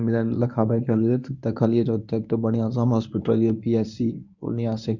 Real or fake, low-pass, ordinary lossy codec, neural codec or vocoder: fake; 7.2 kHz; none; codec, 24 kHz, 0.9 kbps, WavTokenizer, medium speech release version 1